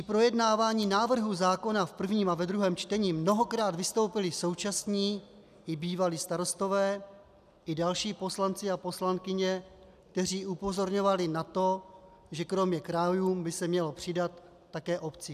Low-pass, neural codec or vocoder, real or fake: 14.4 kHz; none; real